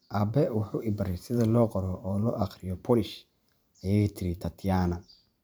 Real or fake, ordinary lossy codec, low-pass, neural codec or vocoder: fake; none; none; vocoder, 44.1 kHz, 128 mel bands every 512 samples, BigVGAN v2